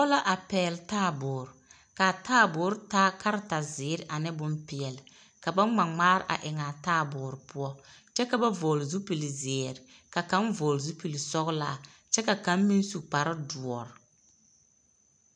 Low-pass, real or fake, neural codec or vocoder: 9.9 kHz; real; none